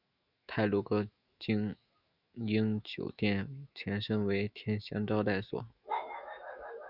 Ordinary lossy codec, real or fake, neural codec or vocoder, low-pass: Opus, 64 kbps; fake; autoencoder, 48 kHz, 128 numbers a frame, DAC-VAE, trained on Japanese speech; 5.4 kHz